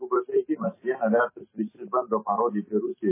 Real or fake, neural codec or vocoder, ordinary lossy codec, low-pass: real; none; MP3, 16 kbps; 3.6 kHz